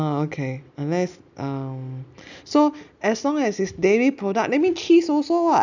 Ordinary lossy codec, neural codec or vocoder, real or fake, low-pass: none; none; real; 7.2 kHz